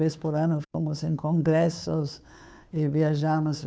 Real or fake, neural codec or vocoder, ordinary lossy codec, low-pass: fake; codec, 16 kHz, 2 kbps, FunCodec, trained on Chinese and English, 25 frames a second; none; none